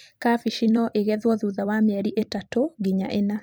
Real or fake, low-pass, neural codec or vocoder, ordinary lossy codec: fake; none; vocoder, 44.1 kHz, 128 mel bands every 256 samples, BigVGAN v2; none